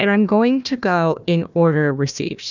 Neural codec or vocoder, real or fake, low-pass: codec, 16 kHz, 1 kbps, FunCodec, trained on Chinese and English, 50 frames a second; fake; 7.2 kHz